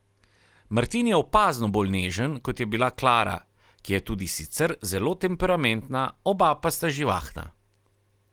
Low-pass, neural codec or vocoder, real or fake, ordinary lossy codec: 19.8 kHz; none; real; Opus, 24 kbps